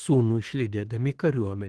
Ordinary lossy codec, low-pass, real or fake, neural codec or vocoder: Opus, 16 kbps; 10.8 kHz; fake; autoencoder, 48 kHz, 32 numbers a frame, DAC-VAE, trained on Japanese speech